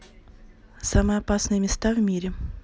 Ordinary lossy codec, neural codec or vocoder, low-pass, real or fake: none; none; none; real